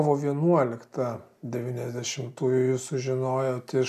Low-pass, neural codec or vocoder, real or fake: 14.4 kHz; none; real